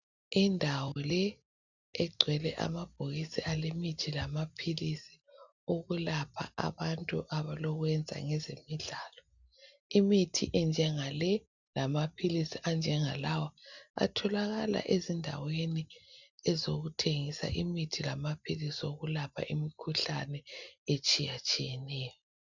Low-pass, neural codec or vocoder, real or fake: 7.2 kHz; none; real